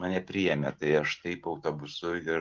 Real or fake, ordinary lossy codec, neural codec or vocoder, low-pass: real; Opus, 16 kbps; none; 7.2 kHz